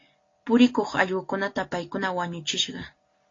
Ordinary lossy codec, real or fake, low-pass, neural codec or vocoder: AAC, 32 kbps; real; 7.2 kHz; none